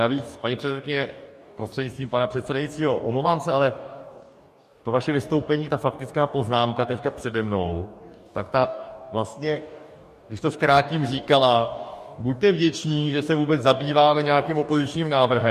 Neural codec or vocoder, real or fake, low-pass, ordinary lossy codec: codec, 44.1 kHz, 2.6 kbps, DAC; fake; 14.4 kHz; MP3, 64 kbps